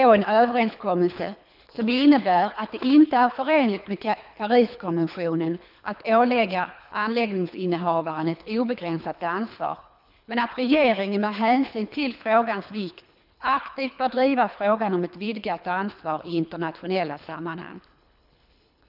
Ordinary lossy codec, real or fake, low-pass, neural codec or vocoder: none; fake; 5.4 kHz; codec, 24 kHz, 3 kbps, HILCodec